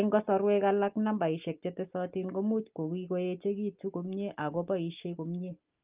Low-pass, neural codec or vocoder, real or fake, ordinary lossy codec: 3.6 kHz; none; real; Opus, 32 kbps